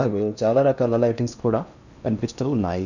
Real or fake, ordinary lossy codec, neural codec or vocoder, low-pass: fake; none; codec, 16 kHz in and 24 kHz out, 0.8 kbps, FocalCodec, streaming, 65536 codes; 7.2 kHz